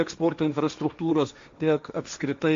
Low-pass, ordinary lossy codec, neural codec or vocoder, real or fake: 7.2 kHz; MP3, 48 kbps; codec, 16 kHz, 1.1 kbps, Voila-Tokenizer; fake